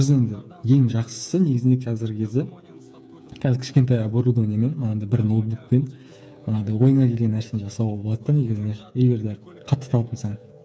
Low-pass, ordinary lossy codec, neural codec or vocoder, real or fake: none; none; codec, 16 kHz, 8 kbps, FreqCodec, smaller model; fake